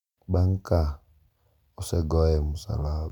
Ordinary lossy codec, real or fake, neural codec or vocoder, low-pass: none; real; none; 19.8 kHz